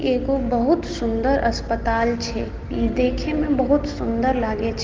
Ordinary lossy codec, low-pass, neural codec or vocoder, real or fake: Opus, 16 kbps; 7.2 kHz; none; real